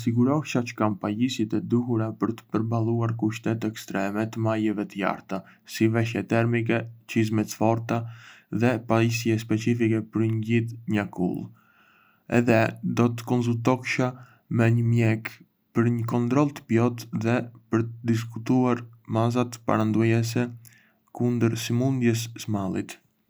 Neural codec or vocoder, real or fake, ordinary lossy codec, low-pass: none; real; none; none